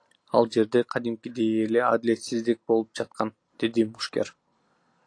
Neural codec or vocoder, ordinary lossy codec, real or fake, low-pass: none; AAC, 48 kbps; real; 9.9 kHz